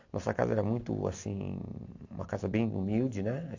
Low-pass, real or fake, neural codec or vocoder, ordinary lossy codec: 7.2 kHz; real; none; AAC, 48 kbps